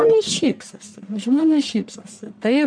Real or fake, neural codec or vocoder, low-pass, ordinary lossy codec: fake; codec, 44.1 kHz, 1.7 kbps, Pupu-Codec; 9.9 kHz; MP3, 64 kbps